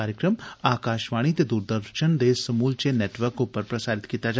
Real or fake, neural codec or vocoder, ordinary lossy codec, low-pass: real; none; none; none